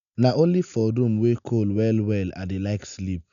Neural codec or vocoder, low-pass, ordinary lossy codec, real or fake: none; 7.2 kHz; none; real